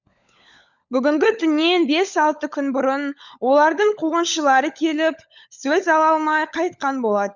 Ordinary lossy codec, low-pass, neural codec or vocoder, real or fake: none; 7.2 kHz; codec, 16 kHz, 16 kbps, FunCodec, trained on LibriTTS, 50 frames a second; fake